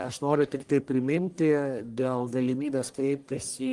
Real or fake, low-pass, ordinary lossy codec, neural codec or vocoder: fake; 10.8 kHz; Opus, 24 kbps; codec, 44.1 kHz, 1.7 kbps, Pupu-Codec